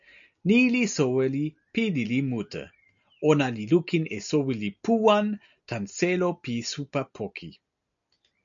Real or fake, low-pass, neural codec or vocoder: real; 7.2 kHz; none